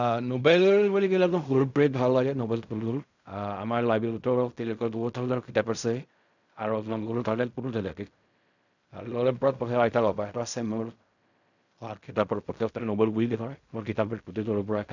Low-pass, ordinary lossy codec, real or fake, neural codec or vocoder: 7.2 kHz; none; fake; codec, 16 kHz in and 24 kHz out, 0.4 kbps, LongCat-Audio-Codec, fine tuned four codebook decoder